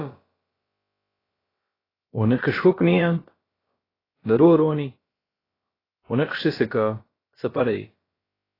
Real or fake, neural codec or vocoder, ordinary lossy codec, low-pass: fake; codec, 16 kHz, about 1 kbps, DyCAST, with the encoder's durations; AAC, 24 kbps; 5.4 kHz